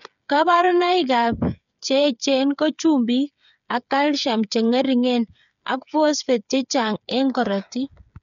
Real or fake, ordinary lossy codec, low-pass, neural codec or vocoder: fake; none; 7.2 kHz; codec, 16 kHz, 8 kbps, FreqCodec, smaller model